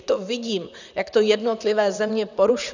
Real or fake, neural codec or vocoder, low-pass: fake; vocoder, 44.1 kHz, 128 mel bands, Pupu-Vocoder; 7.2 kHz